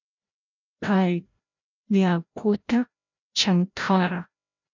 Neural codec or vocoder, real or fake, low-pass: codec, 16 kHz, 0.5 kbps, FreqCodec, larger model; fake; 7.2 kHz